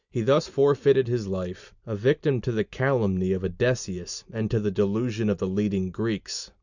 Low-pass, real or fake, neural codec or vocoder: 7.2 kHz; real; none